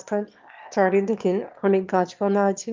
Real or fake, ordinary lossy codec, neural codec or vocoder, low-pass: fake; Opus, 24 kbps; autoencoder, 22.05 kHz, a latent of 192 numbers a frame, VITS, trained on one speaker; 7.2 kHz